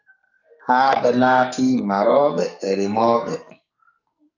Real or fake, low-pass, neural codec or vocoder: fake; 7.2 kHz; codec, 44.1 kHz, 2.6 kbps, SNAC